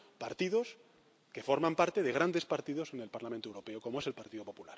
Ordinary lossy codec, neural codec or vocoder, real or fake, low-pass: none; none; real; none